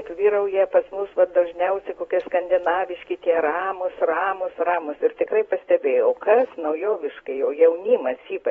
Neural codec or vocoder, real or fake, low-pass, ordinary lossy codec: vocoder, 44.1 kHz, 128 mel bands every 512 samples, BigVGAN v2; fake; 19.8 kHz; AAC, 24 kbps